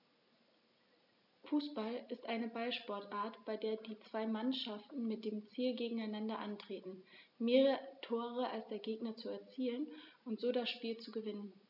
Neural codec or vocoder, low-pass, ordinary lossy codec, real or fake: none; 5.4 kHz; none; real